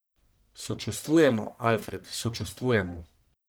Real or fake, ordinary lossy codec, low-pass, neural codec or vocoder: fake; none; none; codec, 44.1 kHz, 1.7 kbps, Pupu-Codec